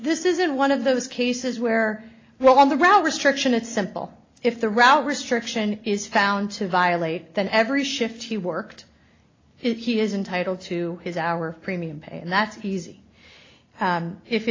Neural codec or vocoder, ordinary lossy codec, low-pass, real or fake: none; AAC, 32 kbps; 7.2 kHz; real